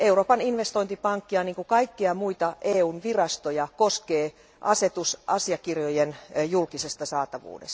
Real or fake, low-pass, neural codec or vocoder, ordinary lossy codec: real; none; none; none